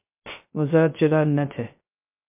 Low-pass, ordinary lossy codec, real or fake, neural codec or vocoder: 3.6 kHz; MP3, 32 kbps; fake; codec, 16 kHz, 0.3 kbps, FocalCodec